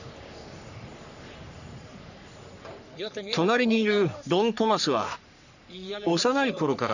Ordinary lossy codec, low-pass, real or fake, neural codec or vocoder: none; 7.2 kHz; fake; codec, 44.1 kHz, 3.4 kbps, Pupu-Codec